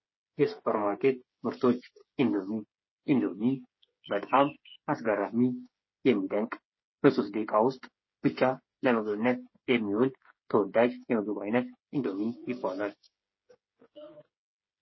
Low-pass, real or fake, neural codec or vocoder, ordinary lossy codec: 7.2 kHz; fake; codec, 16 kHz, 8 kbps, FreqCodec, smaller model; MP3, 24 kbps